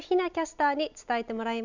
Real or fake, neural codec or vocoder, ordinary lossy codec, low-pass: real; none; none; 7.2 kHz